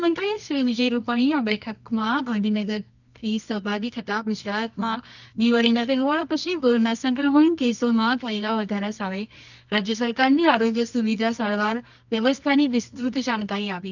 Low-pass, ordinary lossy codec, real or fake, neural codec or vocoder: 7.2 kHz; none; fake; codec, 24 kHz, 0.9 kbps, WavTokenizer, medium music audio release